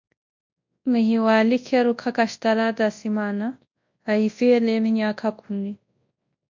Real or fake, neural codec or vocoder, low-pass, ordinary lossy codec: fake; codec, 24 kHz, 0.9 kbps, WavTokenizer, large speech release; 7.2 kHz; MP3, 48 kbps